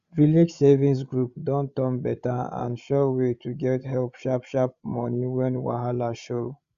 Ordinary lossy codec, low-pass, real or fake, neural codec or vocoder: Opus, 64 kbps; 7.2 kHz; fake; codec, 16 kHz, 16 kbps, FreqCodec, larger model